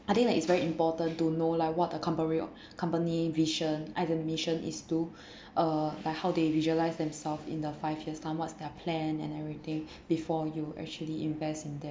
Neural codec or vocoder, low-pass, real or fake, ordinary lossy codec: none; none; real; none